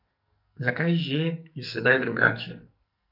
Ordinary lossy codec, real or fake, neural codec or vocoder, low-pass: none; fake; codec, 44.1 kHz, 2.6 kbps, SNAC; 5.4 kHz